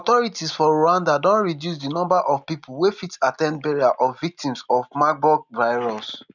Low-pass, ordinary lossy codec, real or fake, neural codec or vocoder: 7.2 kHz; none; real; none